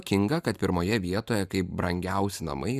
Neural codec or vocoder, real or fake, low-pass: vocoder, 44.1 kHz, 128 mel bands every 512 samples, BigVGAN v2; fake; 14.4 kHz